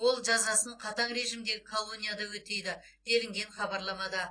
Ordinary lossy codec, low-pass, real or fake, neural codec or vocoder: AAC, 32 kbps; 9.9 kHz; real; none